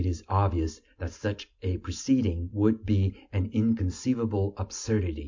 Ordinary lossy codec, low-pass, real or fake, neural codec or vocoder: MP3, 48 kbps; 7.2 kHz; real; none